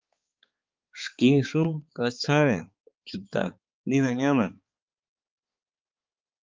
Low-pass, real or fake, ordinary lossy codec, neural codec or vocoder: 7.2 kHz; fake; Opus, 32 kbps; codec, 16 kHz, 4 kbps, X-Codec, HuBERT features, trained on balanced general audio